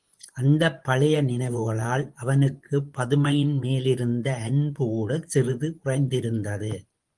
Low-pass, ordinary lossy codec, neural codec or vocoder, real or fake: 10.8 kHz; Opus, 24 kbps; vocoder, 24 kHz, 100 mel bands, Vocos; fake